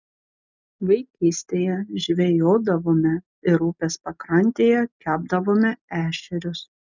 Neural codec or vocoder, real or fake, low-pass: none; real; 7.2 kHz